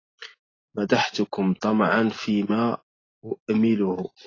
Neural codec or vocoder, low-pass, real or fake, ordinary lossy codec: none; 7.2 kHz; real; AAC, 32 kbps